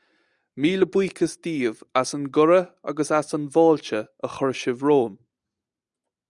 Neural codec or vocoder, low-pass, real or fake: none; 10.8 kHz; real